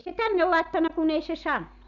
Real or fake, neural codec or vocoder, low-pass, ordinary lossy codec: real; none; 7.2 kHz; none